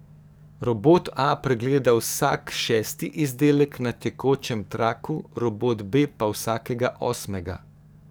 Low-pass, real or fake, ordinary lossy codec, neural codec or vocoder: none; fake; none; codec, 44.1 kHz, 7.8 kbps, DAC